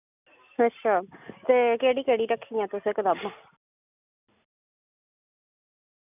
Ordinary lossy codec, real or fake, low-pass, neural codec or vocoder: none; real; 3.6 kHz; none